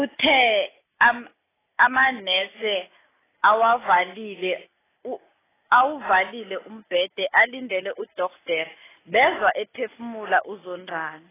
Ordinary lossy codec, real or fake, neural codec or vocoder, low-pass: AAC, 16 kbps; real; none; 3.6 kHz